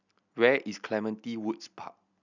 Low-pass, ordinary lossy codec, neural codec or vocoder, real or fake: 7.2 kHz; none; none; real